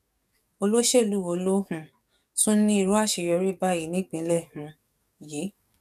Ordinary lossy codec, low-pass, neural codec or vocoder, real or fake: none; 14.4 kHz; codec, 44.1 kHz, 7.8 kbps, DAC; fake